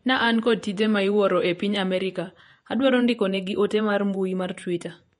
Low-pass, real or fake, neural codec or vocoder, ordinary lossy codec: 19.8 kHz; fake; vocoder, 48 kHz, 128 mel bands, Vocos; MP3, 48 kbps